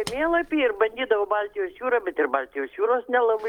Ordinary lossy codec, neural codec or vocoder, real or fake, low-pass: Opus, 32 kbps; none; real; 19.8 kHz